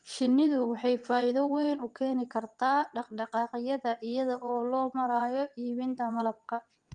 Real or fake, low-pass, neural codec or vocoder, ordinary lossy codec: fake; 9.9 kHz; vocoder, 22.05 kHz, 80 mel bands, WaveNeXt; Opus, 32 kbps